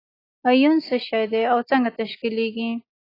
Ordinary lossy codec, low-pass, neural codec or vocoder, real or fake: AAC, 24 kbps; 5.4 kHz; none; real